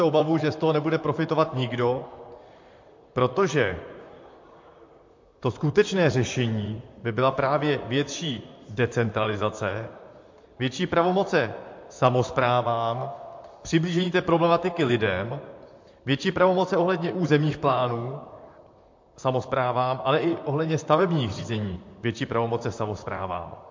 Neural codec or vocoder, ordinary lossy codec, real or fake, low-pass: vocoder, 44.1 kHz, 128 mel bands, Pupu-Vocoder; MP3, 48 kbps; fake; 7.2 kHz